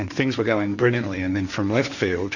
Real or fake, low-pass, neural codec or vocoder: fake; 7.2 kHz; codec, 16 kHz, 1.1 kbps, Voila-Tokenizer